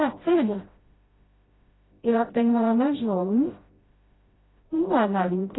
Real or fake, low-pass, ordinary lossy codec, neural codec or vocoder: fake; 7.2 kHz; AAC, 16 kbps; codec, 16 kHz, 0.5 kbps, FreqCodec, smaller model